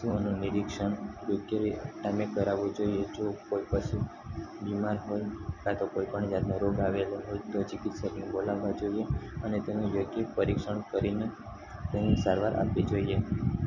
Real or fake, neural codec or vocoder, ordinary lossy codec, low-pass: real; none; none; 7.2 kHz